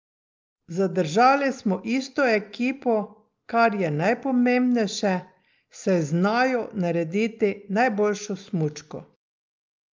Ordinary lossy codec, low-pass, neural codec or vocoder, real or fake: Opus, 24 kbps; 7.2 kHz; none; real